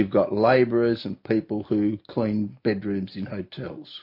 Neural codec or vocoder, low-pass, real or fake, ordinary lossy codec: none; 5.4 kHz; real; MP3, 32 kbps